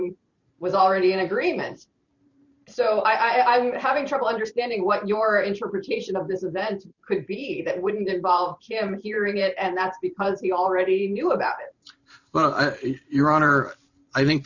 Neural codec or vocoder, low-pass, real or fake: vocoder, 44.1 kHz, 128 mel bands every 512 samples, BigVGAN v2; 7.2 kHz; fake